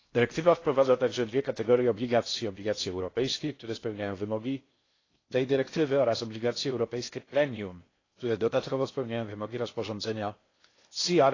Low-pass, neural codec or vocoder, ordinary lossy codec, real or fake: 7.2 kHz; codec, 16 kHz in and 24 kHz out, 0.6 kbps, FocalCodec, streaming, 4096 codes; AAC, 32 kbps; fake